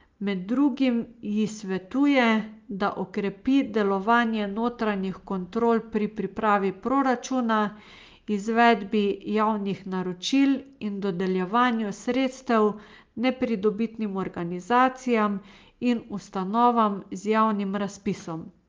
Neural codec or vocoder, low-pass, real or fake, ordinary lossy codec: none; 7.2 kHz; real; Opus, 32 kbps